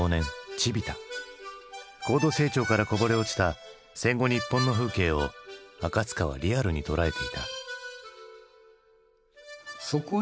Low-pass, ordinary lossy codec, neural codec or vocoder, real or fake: none; none; none; real